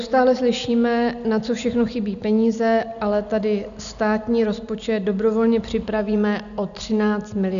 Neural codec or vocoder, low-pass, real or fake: none; 7.2 kHz; real